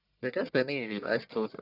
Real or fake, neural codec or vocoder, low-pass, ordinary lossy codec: fake; codec, 44.1 kHz, 1.7 kbps, Pupu-Codec; 5.4 kHz; none